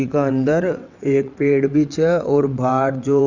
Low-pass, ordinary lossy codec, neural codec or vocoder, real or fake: 7.2 kHz; none; vocoder, 22.05 kHz, 80 mel bands, WaveNeXt; fake